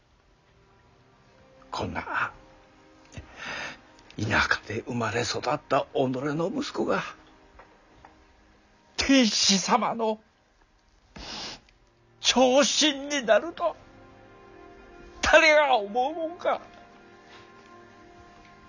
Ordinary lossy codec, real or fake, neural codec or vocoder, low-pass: none; real; none; 7.2 kHz